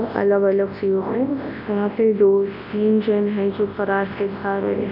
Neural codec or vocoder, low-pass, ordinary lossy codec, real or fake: codec, 24 kHz, 0.9 kbps, WavTokenizer, large speech release; 5.4 kHz; AAC, 48 kbps; fake